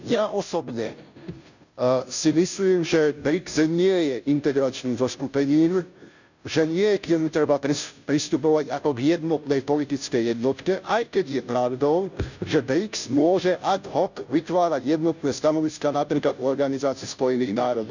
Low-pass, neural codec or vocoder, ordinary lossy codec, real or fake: 7.2 kHz; codec, 16 kHz, 0.5 kbps, FunCodec, trained on Chinese and English, 25 frames a second; none; fake